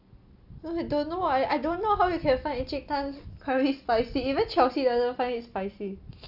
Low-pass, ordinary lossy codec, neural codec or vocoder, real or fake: 5.4 kHz; AAC, 48 kbps; none; real